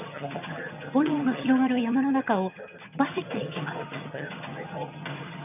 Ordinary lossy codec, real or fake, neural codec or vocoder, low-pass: none; fake; vocoder, 22.05 kHz, 80 mel bands, HiFi-GAN; 3.6 kHz